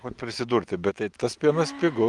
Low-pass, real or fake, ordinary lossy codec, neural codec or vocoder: 10.8 kHz; real; Opus, 24 kbps; none